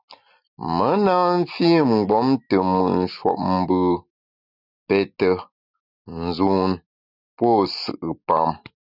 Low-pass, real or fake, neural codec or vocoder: 5.4 kHz; real; none